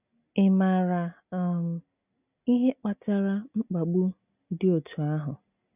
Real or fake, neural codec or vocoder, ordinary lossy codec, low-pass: real; none; none; 3.6 kHz